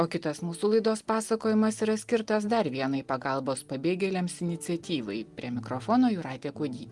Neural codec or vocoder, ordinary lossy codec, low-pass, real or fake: none; Opus, 24 kbps; 10.8 kHz; real